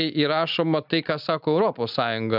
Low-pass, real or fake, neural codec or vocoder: 5.4 kHz; real; none